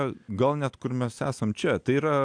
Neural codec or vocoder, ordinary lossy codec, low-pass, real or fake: none; AAC, 64 kbps; 9.9 kHz; real